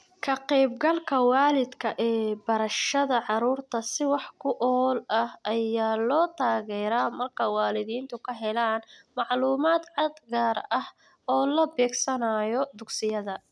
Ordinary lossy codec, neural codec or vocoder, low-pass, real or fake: none; none; none; real